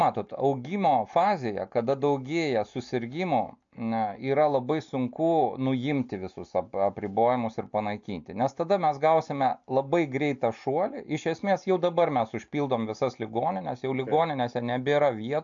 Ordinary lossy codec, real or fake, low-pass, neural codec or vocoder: AAC, 64 kbps; real; 7.2 kHz; none